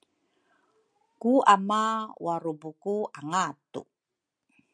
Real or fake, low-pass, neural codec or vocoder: real; 9.9 kHz; none